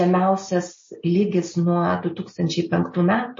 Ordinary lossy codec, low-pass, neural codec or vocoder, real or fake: MP3, 32 kbps; 7.2 kHz; none; real